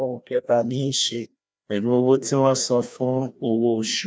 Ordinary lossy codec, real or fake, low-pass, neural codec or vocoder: none; fake; none; codec, 16 kHz, 1 kbps, FreqCodec, larger model